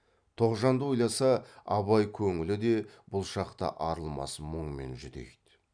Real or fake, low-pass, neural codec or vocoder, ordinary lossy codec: real; 9.9 kHz; none; none